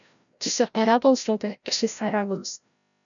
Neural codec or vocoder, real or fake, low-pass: codec, 16 kHz, 0.5 kbps, FreqCodec, larger model; fake; 7.2 kHz